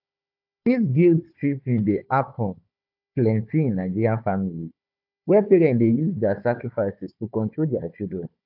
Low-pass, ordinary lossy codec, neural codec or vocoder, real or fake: 5.4 kHz; AAC, 48 kbps; codec, 16 kHz, 4 kbps, FunCodec, trained on Chinese and English, 50 frames a second; fake